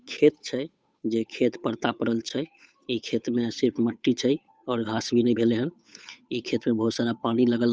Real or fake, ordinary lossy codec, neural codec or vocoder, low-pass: fake; none; codec, 16 kHz, 8 kbps, FunCodec, trained on Chinese and English, 25 frames a second; none